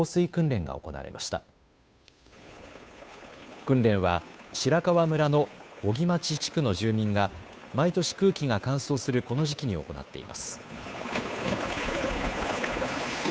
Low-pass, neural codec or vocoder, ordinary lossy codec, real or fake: none; codec, 16 kHz, 2 kbps, FunCodec, trained on Chinese and English, 25 frames a second; none; fake